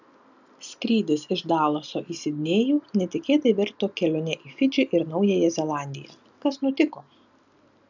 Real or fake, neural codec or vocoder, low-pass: real; none; 7.2 kHz